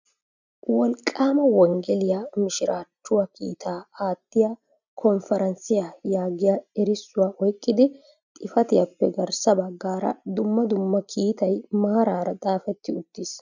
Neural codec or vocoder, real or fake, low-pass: none; real; 7.2 kHz